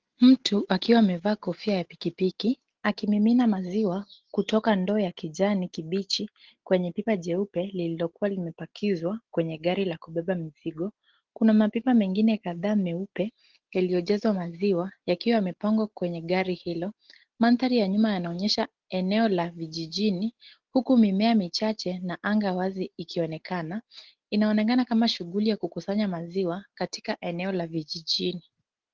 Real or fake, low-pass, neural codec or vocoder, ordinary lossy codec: real; 7.2 kHz; none; Opus, 16 kbps